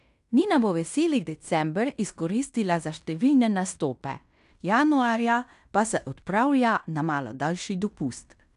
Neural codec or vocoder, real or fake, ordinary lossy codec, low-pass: codec, 16 kHz in and 24 kHz out, 0.9 kbps, LongCat-Audio-Codec, fine tuned four codebook decoder; fake; none; 10.8 kHz